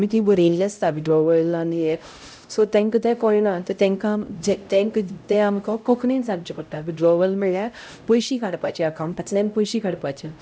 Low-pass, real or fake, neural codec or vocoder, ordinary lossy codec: none; fake; codec, 16 kHz, 0.5 kbps, X-Codec, HuBERT features, trained on LibriSpeech; none